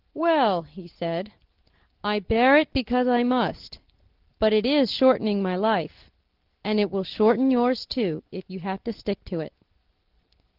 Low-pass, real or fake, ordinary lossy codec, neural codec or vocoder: 5.4 kHz; real; Opus, 16 kbps; none